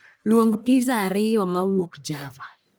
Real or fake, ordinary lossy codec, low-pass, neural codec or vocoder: fake; none; none; codec, 44.1 kHz, 1.7 kbps, Pupu-Codec